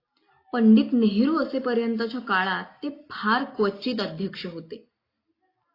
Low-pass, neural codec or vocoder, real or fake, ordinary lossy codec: 5.4 kHz; none; real; AAC, 32 kbps